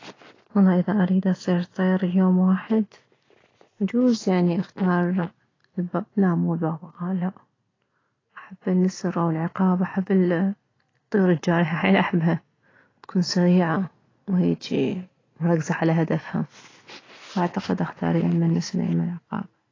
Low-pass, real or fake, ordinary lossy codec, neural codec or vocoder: 7.2 kHz; real; AAC, 32 kbps; none